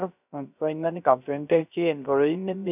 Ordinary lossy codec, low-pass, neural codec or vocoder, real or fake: none; 3.6 kHz; codec, 16 kHz, 0.3 kbps, FocalCodec; fake